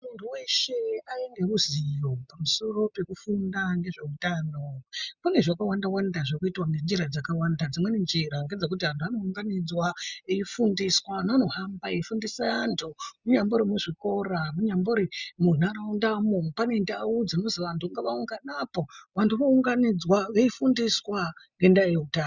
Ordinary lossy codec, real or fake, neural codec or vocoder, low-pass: Opus, 64 kbps; real; none; 7.2 kHz